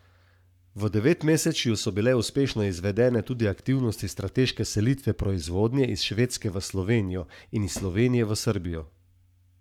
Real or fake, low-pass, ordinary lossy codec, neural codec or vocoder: fake; 19.8 kHz; none; codec, 44.1 kHz, 7.8 kbps, Pupu-Codec